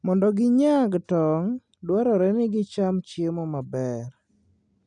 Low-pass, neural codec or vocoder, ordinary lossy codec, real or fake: 9.9 kHz; none; none; real